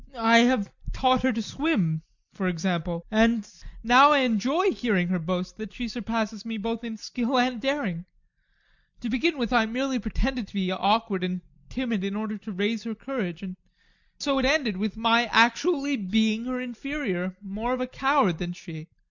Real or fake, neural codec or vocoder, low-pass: real; none; 7.2 kHz